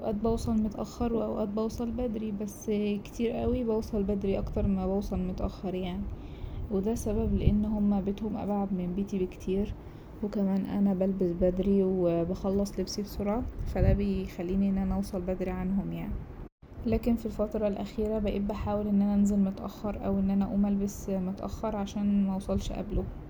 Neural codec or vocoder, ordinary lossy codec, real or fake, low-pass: none; none; real; none